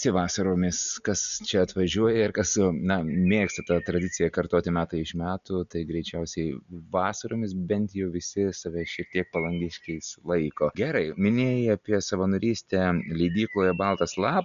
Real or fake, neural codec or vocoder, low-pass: real; none; 7.2 kHz